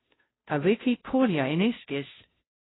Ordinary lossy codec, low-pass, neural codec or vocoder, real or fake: AAC, 16 kbps; 7.2 kHz; codec, 16 kHz, 0.5 kbps, FunCodec, trained on Chinese and English, 25 frames a second; fake